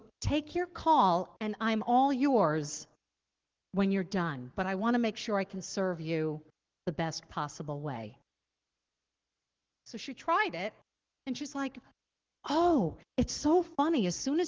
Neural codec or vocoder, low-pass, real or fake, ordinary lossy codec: codec, 44.1 kHz, 7.8 kbps, Pupu-Codec; 7.2 kHz; fake; Opus, 16 kbps